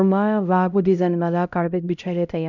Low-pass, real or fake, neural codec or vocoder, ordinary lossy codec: 7.2 kHz; fake; codec, 16 kHz, 0.5 kbps, X-Codec, HuBERT features, trained on LibriSpeech; none